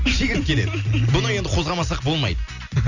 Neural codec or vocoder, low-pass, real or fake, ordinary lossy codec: none; 7.2 kHz; real; none